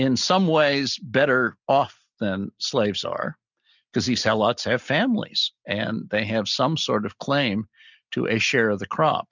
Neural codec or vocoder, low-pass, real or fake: none; 7.2 kHz; real